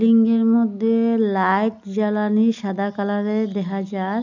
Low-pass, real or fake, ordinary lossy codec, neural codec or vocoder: 7.2 kHz; real; none; none